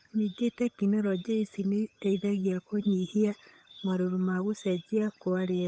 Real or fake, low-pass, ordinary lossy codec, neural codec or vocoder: fake; none; none; codec, 16 kHz, 8 kbps, FunCodec, trained on Chinese and English, 25 frames a second